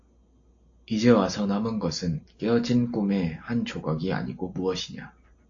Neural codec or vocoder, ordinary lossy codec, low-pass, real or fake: none; AAC, 48 kbps; 7.2 kHz; real